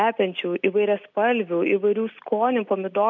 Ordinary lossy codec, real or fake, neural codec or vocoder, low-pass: MP3, 64 kbps; real; none; 7.2 kHz